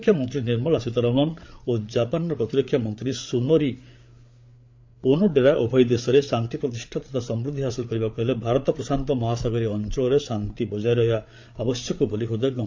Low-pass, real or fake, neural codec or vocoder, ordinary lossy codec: 7.2 kHz; fake; codec, 16 kHz, 8 kbps, FreqCodec, larger model; MP3, 48 kbps